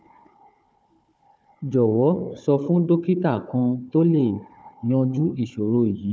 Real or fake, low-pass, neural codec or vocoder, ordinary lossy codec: fake; none; codec, 16 kHz, 4 kbps, FunCodec, trained on Chinese and English, 50 frames a second; none